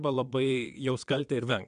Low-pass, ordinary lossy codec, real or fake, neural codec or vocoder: 9.9 kHz; AAC, 64 kbps; fake; vocoder, 22.05 kHz, 80 mel bands, WaveNeXt